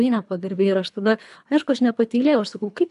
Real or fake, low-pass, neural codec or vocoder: fake; 10.8 kHz; codec, 24 kHz, 3 kbps, HILCodec